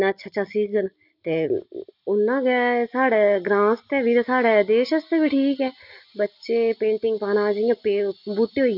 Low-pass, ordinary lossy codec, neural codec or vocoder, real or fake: 5.4 kHz; none; none; real